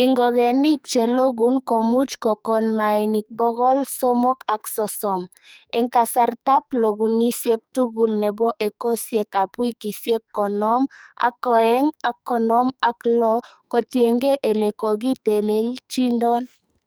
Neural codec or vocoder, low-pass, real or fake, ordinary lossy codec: codec, 44.1 kHz, 2.6 kbps, SNAC; none; fake; none